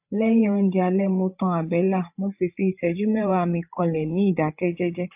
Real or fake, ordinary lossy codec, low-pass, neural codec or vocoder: fake; AAC, 32 kbps; 3.6 kHz; codec, 16 kHz, 8 kbps, FreqCodec, larger model